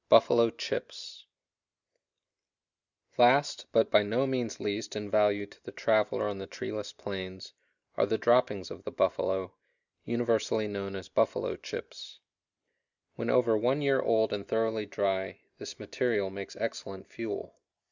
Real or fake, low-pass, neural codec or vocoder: real; 7.2 kHz; none